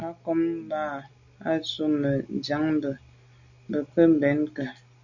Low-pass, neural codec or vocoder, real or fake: 7.2 kHz; none; real